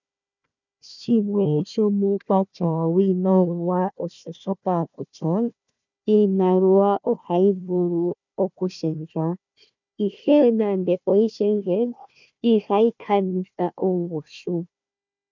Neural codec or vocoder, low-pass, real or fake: codec, 16 kHz, 1 kbps, FunCodec, trained on Chinese and English, 50 frames a second; 7.2 kHz; fake